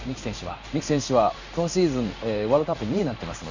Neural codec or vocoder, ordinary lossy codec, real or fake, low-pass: codec, 16 kHz in and 24 kHz out, 1 kbps, XY-Tokenizer; none; fake; 7.2 kHz